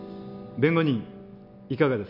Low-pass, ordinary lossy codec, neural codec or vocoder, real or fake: 5.4 kHz; MP3, 48 kbps; none; real